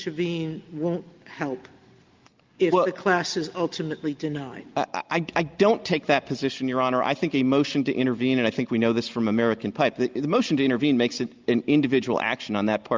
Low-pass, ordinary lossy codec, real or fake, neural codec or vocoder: 7.2 kHz; Opus, 24 kbps; real; none